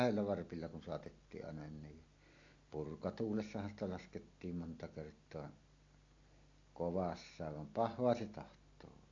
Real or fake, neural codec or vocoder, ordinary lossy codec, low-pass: real; none; AAC, 48 kbps; 7.2 kHz